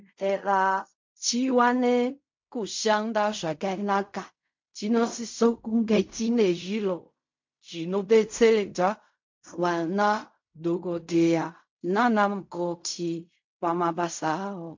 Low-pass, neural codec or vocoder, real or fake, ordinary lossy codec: 7.2 kHz; codec, 16 kHz in and 24 kHz out, 0.4 kbps, LongCat-Audio-Codec, fine tuned four codebook decoder; fake; MP3, 48 kbps